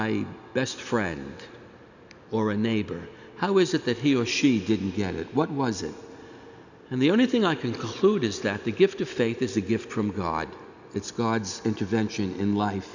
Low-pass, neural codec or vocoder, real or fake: 7.2 kHz; none; real